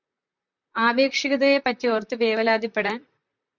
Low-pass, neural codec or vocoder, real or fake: 7.2 kHz; vocoder, 44.1 kHz, 128 mel bands, Pupu-Vocoder; fake